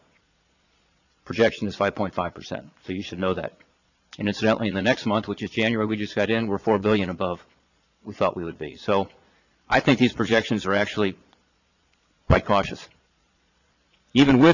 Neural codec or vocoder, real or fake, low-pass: vocoder, 22.05 kHz, 80 mel bands, Vocos; fake; 7.2 kHz